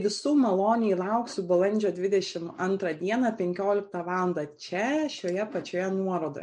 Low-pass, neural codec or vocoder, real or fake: 9.9 kHz; none; real